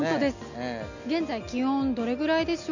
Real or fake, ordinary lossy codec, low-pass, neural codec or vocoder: real; none; 7.2 kHz; none